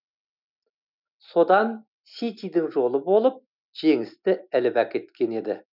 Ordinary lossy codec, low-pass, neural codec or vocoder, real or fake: none; 5.4 kHz; none; real